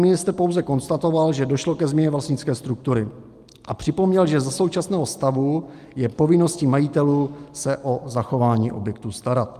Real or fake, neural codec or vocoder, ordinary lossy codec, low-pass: real; none; Opus, 32 kbps; 14.4 kHz